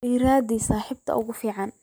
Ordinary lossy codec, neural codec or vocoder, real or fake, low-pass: none; none; real; none